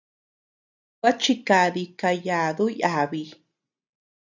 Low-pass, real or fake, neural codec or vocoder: 7.2 kHz; real; none